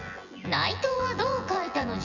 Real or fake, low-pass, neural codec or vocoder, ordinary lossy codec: fake; 7.2 kHz; vocoder, 24 kHz, 100 mel bands, Vocos; none